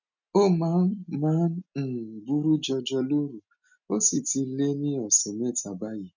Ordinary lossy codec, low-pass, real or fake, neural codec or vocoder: none; 7.2 kHz; real; none